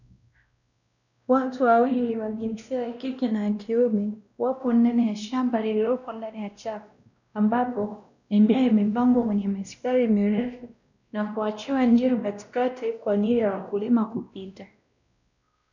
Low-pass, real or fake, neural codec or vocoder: 7.2 kHz; fake; codec, 16 kHz, 1 kbps, X-Codec, WavLM features, trained on Multilingual LibriSpeech